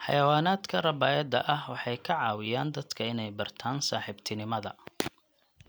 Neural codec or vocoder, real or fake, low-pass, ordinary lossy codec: none; real; none; none